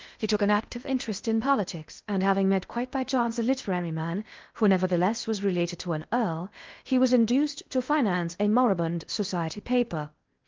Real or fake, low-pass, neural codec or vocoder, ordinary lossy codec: fake; 7.2 kHz; codec, 16 kHz in and 24 kHz out, 0.8 kbps, FocalCodec, streaming, 65536 codes; Opus, 32 kbps